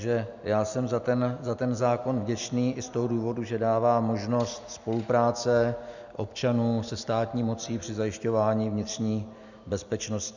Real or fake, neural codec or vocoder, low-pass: real; none; 7.2 kHz